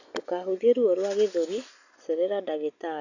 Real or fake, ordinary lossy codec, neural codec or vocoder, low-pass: real; none; none; 7.2 kHz